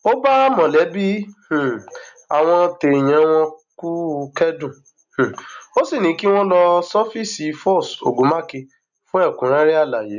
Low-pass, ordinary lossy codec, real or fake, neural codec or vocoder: 7.2 kHz; none; real; none